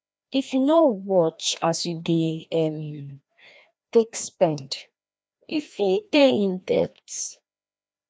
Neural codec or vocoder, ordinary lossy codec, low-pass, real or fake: codec, 16 kHz, 1 kbps, FreqCodec, larger model; none; none; fake